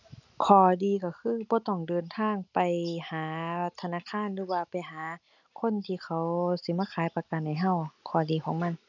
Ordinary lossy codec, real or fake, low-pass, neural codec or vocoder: none; real; 7.2 kHz; none